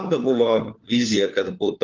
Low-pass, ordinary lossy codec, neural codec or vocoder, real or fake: 7.2 kHz; Opus, 24 kbps; codec, 16 kHz, 4 kbps, FunCodec, trained on LibriTTS, 50 frames a second; fake